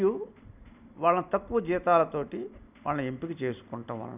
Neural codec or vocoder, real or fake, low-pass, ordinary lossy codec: none; real; 3.6 kHz; none